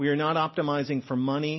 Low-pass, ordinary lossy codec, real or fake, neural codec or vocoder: 7.2 kHz; MP3, 24 kbps; real; none